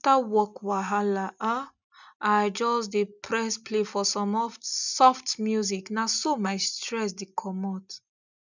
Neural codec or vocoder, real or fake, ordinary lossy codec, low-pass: none; real; none; 7.2 kHz